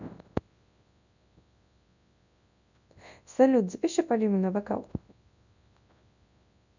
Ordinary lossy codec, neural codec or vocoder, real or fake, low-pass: none; codec, 24 kHz, 0.9 kbps, WavTokenizer, large speech release; fake; 7.2 kHz